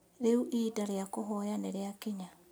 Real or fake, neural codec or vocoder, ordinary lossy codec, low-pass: real; none; none; none